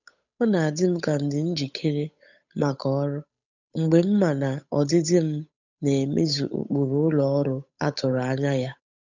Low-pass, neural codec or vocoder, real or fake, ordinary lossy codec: 7.2 kHz; codec, 16 kHz, 8 kbps, FunCodec, trained on Chinese and English, 25 frames a second; fake; none